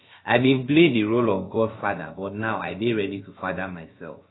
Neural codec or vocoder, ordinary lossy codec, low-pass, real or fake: codec, 16 kHz, about 1 kbps, DyCAST, with the encoder's durations; AAC, 16 kbps; 7.2 kHz; fake